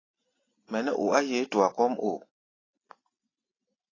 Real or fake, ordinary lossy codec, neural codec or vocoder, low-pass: real; AAC, 32 kbps; none; 7.2 kHz